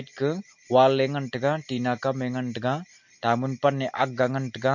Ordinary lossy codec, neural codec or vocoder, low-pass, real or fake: MP3, 48 kbps; none; 7.2 kHz; real